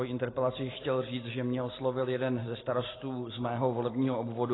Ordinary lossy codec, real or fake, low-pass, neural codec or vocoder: AAC, 16 kbps; real; 7.2 kHz; none